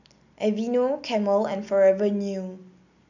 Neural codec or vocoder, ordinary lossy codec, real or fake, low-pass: none; none; real; 7.2 kHz